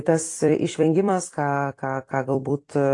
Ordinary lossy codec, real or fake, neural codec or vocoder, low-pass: AAC, 48 kbps; fake; vocoder, 44.1 kHz, 128 mel bands every 256 samples, BigVGAN v2; 10.8 kHz